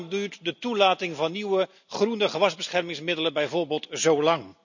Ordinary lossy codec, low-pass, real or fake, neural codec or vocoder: none; 7.2 kHz; real; none